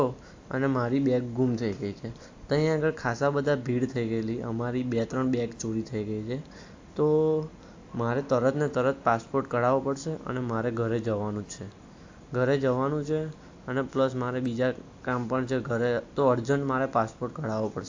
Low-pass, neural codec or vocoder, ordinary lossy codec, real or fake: 7.2 kHz; none; AAC, 48 kbps; real